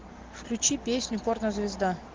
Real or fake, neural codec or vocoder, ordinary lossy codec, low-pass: real; none; Opus, 16 kbps; 7.2 kHz